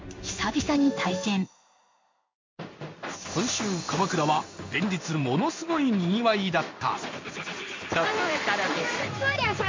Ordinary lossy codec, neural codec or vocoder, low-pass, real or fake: MP3, 48 kbps; codec, 16 kHz in and 24 kHz out, 1 kbps, XY-Tokenizer; 7.2 kHz; fake